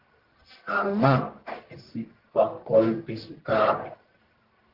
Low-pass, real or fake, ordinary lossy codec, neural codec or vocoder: 5.4 kHz; fake; Opus, 16 kbps; codec, 44.1 kHz, 1.7 kbps, Pupu-Codec